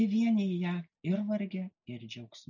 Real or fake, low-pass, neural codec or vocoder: real; 7.2 kHz; none